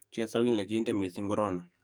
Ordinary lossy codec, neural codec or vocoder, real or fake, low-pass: none; codec, 44.1 kHz, 2.6 kbps, SNAC; fake; none